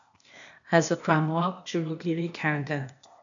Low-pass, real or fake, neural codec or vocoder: 7.2 kHz; fake; codec, 16 kHz, 0.8 kbps, ZipCodec